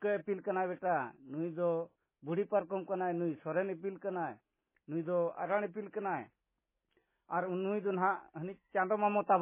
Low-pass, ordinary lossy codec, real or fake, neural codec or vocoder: 3.6 kHz; MP3, 16 kbps; real; none